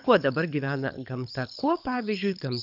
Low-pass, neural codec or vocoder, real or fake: 5.4 kHz; codec, 24 kHz, 6 kbps, HILCodec; fake